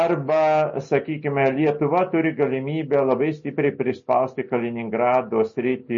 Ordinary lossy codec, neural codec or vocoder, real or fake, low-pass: MP3, 32 kbps; none; real; 7.2 kHz